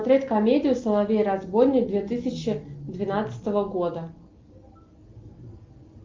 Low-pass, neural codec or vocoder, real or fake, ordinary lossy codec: 7.2 kHz; none; real; Opus, 32 kbps